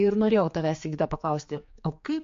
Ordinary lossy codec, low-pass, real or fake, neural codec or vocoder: MP3, 48 kbps; 7.2 kHz; fake; codec, 16 kHz, 4 kbps, X-Codec, HuBERT features, trained on general audio